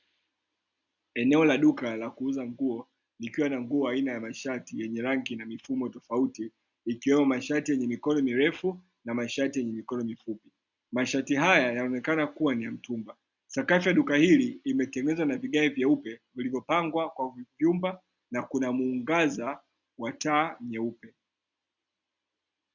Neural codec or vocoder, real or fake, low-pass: none; real; 7.2 kHz